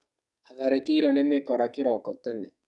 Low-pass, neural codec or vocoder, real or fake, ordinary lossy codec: 10.8 kHz; codec, 44.1 kHz, 2.6 kbps, SNAC; fake; none